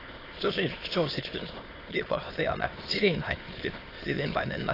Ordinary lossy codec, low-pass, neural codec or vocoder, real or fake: AAC, 24 kbps; 5.4 kHz; autoencoder, 22.05 kHz, a latent of 192 numbers a frame, VITS, trained on many speakers; fake